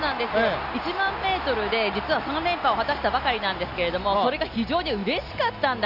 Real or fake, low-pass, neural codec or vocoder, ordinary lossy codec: real; 5.4 kHz; none; none